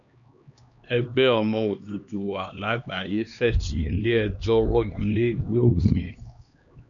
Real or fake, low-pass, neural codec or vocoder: fake; 7.2 kHz; codec, 16 kHz, 2 kbps, X-Codec, HuBERT features, trained on LibriSpeech